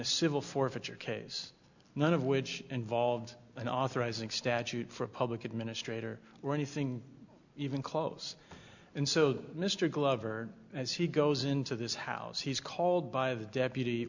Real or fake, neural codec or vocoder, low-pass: real; none; 7.2 kHz